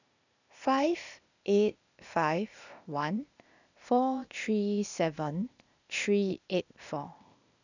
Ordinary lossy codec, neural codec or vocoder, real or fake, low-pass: none; codec, 16 kHz, 0.8 kbps, ZipCodec; fake; 7.2 kHz